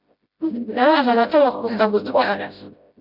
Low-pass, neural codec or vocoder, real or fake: 5.4 kHz; codec, 16 kHz, 0.5 kbps, FreqCodec, smaller model; fake